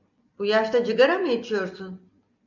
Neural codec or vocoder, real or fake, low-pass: none; real; 7.2 kHz